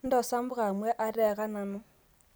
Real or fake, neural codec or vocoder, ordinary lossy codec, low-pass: real; none; none; none